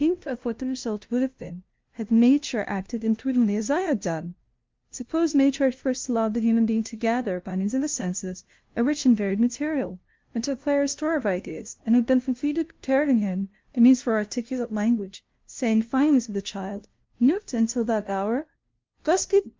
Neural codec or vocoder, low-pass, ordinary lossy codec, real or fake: codec, 16 kHz, 0.5 kbps, FunCodec, trained on LibriTTS, 25 frames a second; 7.2 kHz; Opus, 32 kbps; fake